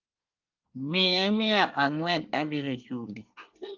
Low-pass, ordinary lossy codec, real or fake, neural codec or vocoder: 7.2 kHz; Opus, 32 kbps; fake; codec, 24 kHz, 1 kbps, SNAC